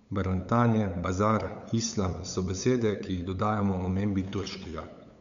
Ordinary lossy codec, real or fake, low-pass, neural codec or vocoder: none; fake; 7.2 kHz; codec, 16 kHz, 8 kbps, FunCodec, trained on LibriTTS, 25 frames a second